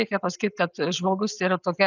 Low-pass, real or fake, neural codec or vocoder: 7.2 kHz; real; none